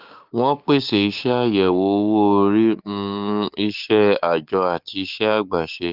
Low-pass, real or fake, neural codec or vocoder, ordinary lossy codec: 5.4 kHz; fake; codec, 16 kHz, 6 kbps, DAC; Opus, 32 kbps